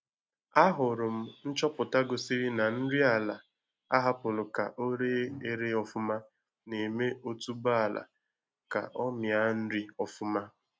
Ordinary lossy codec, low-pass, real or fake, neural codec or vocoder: none; none; real; none